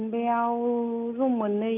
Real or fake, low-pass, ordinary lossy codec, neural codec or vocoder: real; 3.6 kHz; none; none